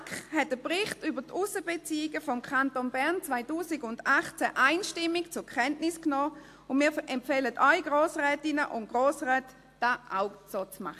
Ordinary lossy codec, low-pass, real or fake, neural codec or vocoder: AAC, 64 kbps; 14.4 kHz; real; none